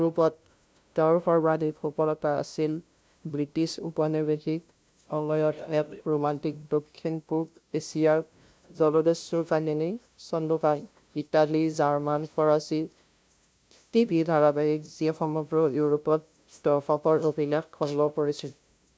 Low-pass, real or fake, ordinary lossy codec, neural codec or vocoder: none; fake; none; codec, 16 kHz, 0.5 kbps, FunCodec, trained on LibriTTS, 25 frames a second